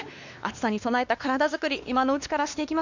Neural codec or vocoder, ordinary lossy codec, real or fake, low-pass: codec, 16 kHz, 2 kbps, X-Codec, WavLM features, trained on Multilingual LibriSpeech; none; fake; 7.2 kHz